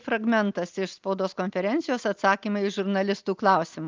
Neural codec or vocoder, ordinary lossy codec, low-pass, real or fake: none; Opus, 24 kbps; 7.2 kHz; real